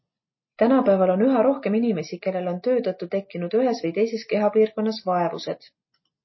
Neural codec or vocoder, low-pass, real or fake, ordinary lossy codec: none; 7.2 kHz; real; MP3, 24 kbps